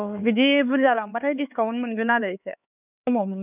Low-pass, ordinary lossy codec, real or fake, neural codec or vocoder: 3.6 kHz; none; fake; codec, 16 kHz, 4 kbps, X-Codec, HuBERT features, trained on LibriSpeech